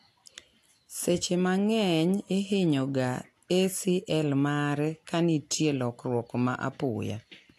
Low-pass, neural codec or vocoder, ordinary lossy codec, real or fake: 14.4 kHz; none; AAC, 64 kbps; real